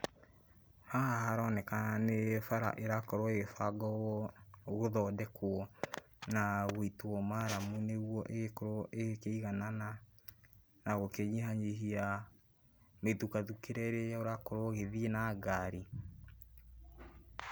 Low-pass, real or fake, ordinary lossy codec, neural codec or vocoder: none; real; none; none